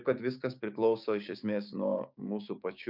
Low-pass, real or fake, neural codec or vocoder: 5.4 kHz; fake; codec, 16 kHz, 6 kbps, DAC